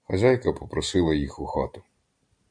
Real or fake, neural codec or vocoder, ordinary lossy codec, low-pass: real; none; AAC, 64 kbps; 9.9 kHz